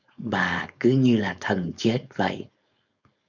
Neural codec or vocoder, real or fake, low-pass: codec, 16 kHz, 4.8 kbps, FACodec; fake; 7.2 kHz